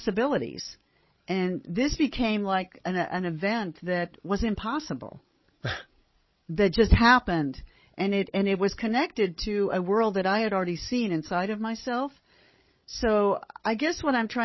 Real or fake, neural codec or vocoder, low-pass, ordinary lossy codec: fake; codec, 16 kHz, 16 kbps, FreqCodec, larger model; 7.2 kHz; MP3, 24 kbps